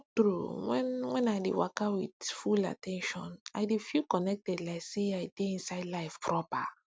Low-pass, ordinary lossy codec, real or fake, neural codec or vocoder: none; none; real; none